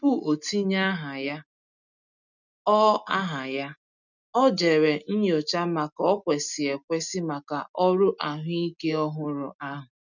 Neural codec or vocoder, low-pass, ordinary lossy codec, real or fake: none; 7.2 kHz; none; real